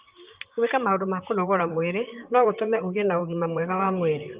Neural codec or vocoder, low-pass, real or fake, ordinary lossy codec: vocoder, 44.1 kHz, 128 mel bands, Pupu-Vocoder; 3.6 kHz; fake; Opus, 32 kbps